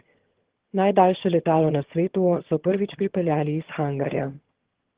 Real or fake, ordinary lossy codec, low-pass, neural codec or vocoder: fake; Opus, 16 kbps; 3.6 kHz; vocoder, 22.05 kHz, 80 mel bands, HiFi-GAN